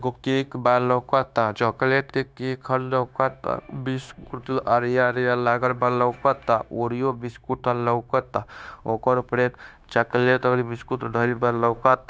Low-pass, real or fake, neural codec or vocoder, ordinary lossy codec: none; fake; codec, 16 kHz, 0.9 kbps, LongCat-Audio-Codec; none